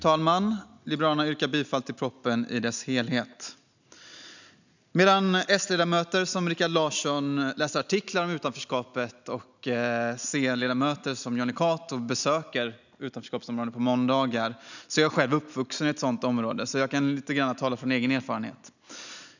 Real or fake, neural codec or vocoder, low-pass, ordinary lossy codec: real; none; 7.2 kHz; none